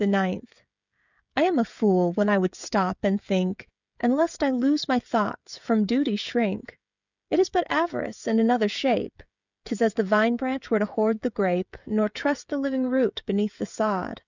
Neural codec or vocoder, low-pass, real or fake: codec, 16 kHz, 16 kbps, FreqCodec, smaller model; 7.2 kHz; fake